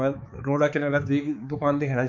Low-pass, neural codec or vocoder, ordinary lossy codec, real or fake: 7.2 kHz; codec, 16 kHz, 4 kbps, X-Codec, HuBERT features, trained on balanced general audio; none; fake